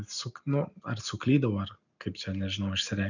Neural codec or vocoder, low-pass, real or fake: none; 7.2 kHz; real